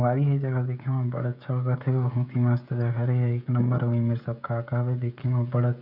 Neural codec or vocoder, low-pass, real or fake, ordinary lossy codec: codec, 16 kHz, 16 kbps, FreqCodec, smaller model; 5.4 kHz; fake; none